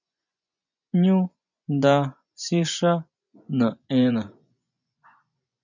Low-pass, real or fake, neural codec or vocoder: 7.2 kHz; fake; vocoder, 24 kHz, 100 mel bands, Vocos